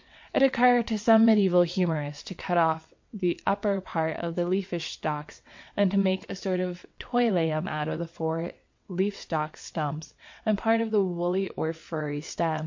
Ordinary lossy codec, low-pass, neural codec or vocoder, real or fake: MP3, 48 kbps; 7.2 kHz; vocoder, 22.05 kHz, 80 mel bands, WaveNeXt; fake